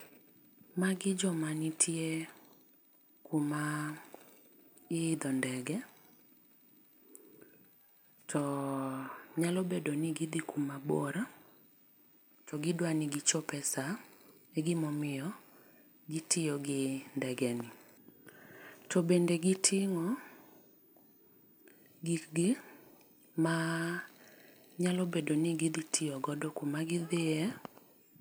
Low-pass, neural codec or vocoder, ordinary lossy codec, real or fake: none; none; none; real